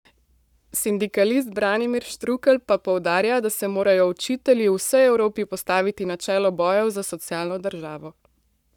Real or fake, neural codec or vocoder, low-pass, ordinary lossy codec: fake; codec, 44.1 kHz, 7.8 kbps, Pupu-Codec; 19.8 kHz; none